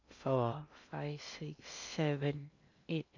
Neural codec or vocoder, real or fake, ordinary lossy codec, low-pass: codec, 16 kHz in and 24 kHz out, 0.8 kbps, FocalCodec, streaming, 65536 codes; fake; none; 7.2 kHz